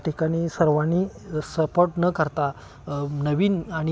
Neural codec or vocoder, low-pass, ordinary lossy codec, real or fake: none; none; none; real